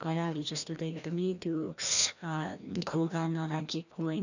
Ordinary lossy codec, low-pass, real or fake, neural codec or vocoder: none; 7.2 kHz; fake; codec, 16 kHz, 1 kbps, FreqCodec, larger model